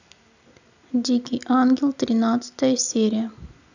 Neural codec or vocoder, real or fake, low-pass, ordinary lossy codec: none; real; 7.2 kHz; none